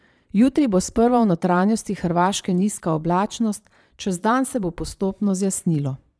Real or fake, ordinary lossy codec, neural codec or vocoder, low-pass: fake; none; vocoder, 22.05 kHz, 80 mel bands, Vocos; none